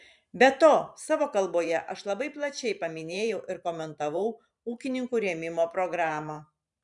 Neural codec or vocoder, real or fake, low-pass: vocoder, 44.1 kHz, 128 mel bands every 512 samples, BigVGAN v2; fake; 10.8 kHz